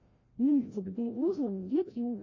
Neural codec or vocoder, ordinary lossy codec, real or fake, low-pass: codec, 16 kHz, 0.5 kbps, FreqCodec, larger model; MP3, 32 kbps; fake; 7.2 kHz